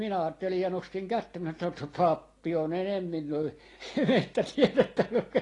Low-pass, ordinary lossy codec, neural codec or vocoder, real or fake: 10.8 kHz; AAC, 32 kbps; none; real